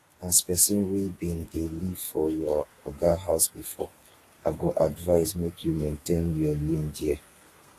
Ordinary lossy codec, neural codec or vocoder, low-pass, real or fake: AAC, 48 kbps; codec, 32 kHz, 1.9 kbps, SNAC; 14.4 kHz; fake